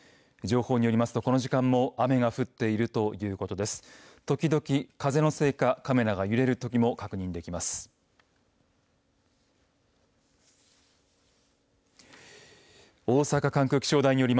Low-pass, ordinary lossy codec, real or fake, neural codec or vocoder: none; none; real; none